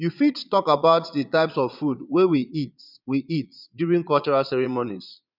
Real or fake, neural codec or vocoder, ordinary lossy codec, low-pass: fake; vocoder, 22.05 kHz, 80 mel bands, Vocos; none; 5.4 kHz